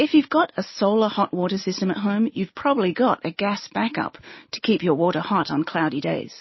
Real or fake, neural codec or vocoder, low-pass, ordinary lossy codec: real; none; 7.2 kHz; MP3, 24 kbps